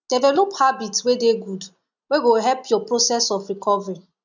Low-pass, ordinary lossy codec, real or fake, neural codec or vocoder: 7.2 kHz; none; real; none